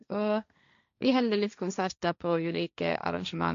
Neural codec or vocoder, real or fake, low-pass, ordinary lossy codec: codec, 16 kHz, 1.1 kbps, Voila-Tokenizer; fake; 7.2 kHz; MP3, 64 kbps